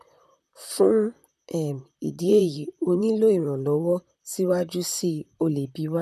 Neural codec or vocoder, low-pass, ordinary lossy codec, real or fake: vocoder, 44.1 kHz, 128 mel bands, Pupu-Vocoder; 14.4 kHz; none; fake